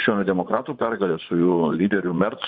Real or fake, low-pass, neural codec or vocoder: fake; 5.4 kHz; vocoder, 24 kHz, 100 mel bands, Vocos